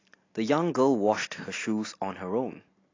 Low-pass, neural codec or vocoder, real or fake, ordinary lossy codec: 7.2 kHz; none; real; AAC, 32 kbps